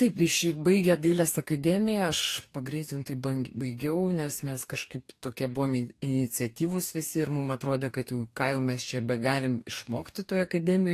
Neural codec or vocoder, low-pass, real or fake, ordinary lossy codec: codec, 44.1 kHz, 2.6 kbps, DAC; 14.4 kHz; fake; AAC, 64 kbps